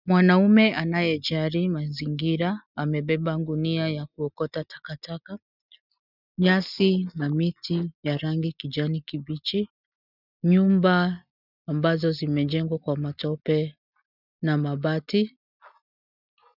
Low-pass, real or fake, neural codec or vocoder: 5.4 kHz; real; none